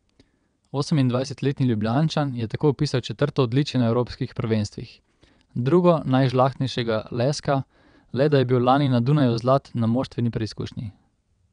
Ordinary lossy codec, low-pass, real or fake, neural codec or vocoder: none; 9.9 kHz; fake; vocoder, 22.05 kHz, 80 mel bands, WaveNeXt